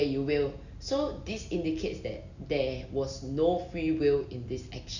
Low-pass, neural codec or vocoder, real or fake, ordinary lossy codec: 7.2 kHz; none; real; none